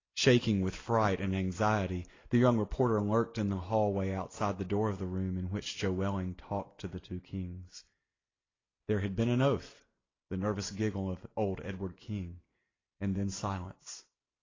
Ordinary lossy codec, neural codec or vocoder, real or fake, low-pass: AAC, 32 kbps; none; real; 7.2 kHz